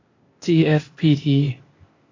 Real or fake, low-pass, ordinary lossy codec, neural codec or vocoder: fake; 7.2 kHz; AAC, 32 kbps; codec, 16 kHz, 0.8 kbps, ZipCodec